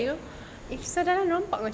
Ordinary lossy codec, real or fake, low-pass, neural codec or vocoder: none; real; none; none